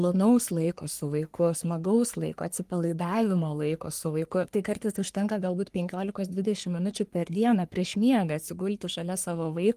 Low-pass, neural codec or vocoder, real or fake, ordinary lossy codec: 14.4 kHz; codec, 44.1 kHz, 3.4 kbps, Pupu-Codec; fake; Opus, 24 kbps